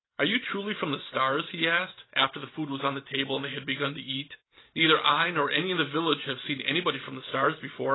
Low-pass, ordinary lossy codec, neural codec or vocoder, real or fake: 7.2 kHz; AAC, 16 kbps; none; real